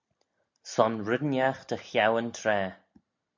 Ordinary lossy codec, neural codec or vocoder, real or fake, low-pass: MP3, 64 kbps; none; real; 7.2 kHz